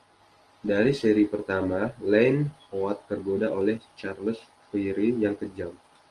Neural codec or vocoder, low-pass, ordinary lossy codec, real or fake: none; 10.8 kHz; Opus, 24 kbps; real